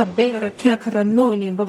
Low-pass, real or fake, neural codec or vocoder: 19.8 kHz; fake; codec, 44.1 kHz, 0.9 kbps, DAC